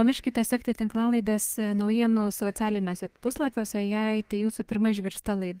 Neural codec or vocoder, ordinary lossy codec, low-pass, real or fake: codec, 32 kHz, 1.9 kbps, SNAC; Opus, 24 kbps; 14.4 kHz; fake